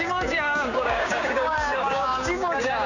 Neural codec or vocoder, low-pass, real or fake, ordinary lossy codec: codec, 16 kHz, 6 kbps, DAC; 7.2 kHz; fake; none